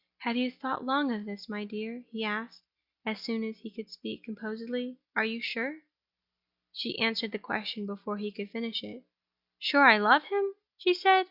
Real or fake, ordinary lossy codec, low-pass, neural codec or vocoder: real; Opus, 64 kbps; 5.4 kHz; none